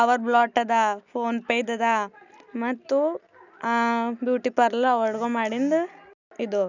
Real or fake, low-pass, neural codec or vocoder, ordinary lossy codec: real; 7.2 kHz; none; none